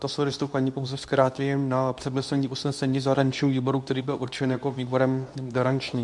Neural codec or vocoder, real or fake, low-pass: codec, 24 kHz, 0.9 kbps, WavTokenizer, medium speech release version 2; fake; 10.8 kHz